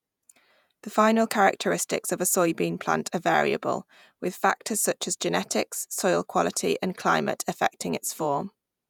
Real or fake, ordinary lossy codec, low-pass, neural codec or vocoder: fake; none; none; vocoder, 48 kHz, 128 mel bands, Vocos